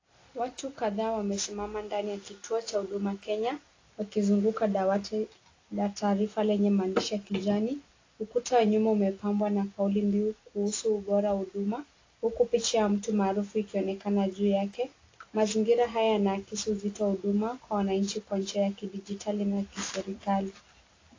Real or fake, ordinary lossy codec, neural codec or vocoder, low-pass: real; AAC, 32 kbps; none; 7.2 kHz